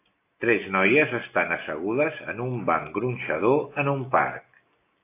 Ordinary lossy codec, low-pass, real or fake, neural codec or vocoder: AAC, 24 kbps; 3.6 kHz; real; none